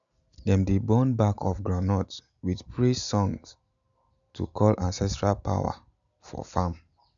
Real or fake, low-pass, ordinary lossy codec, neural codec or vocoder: real; 7.2 kHz; none; none